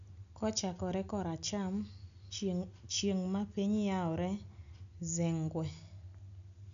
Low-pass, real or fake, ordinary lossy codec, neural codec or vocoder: 7.2 kHz; real; none; none